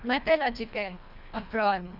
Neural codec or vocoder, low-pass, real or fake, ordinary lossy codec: codec, 24 kHz, 1.5 kbps, HILCodec; 5.4 kHz; fake; none